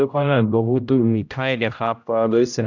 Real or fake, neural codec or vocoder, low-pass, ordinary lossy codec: fake; codec, 16 kHz, 0.5 kbps, X-Codec, HuBERT features, trained on general audio; 7.2 kHz; none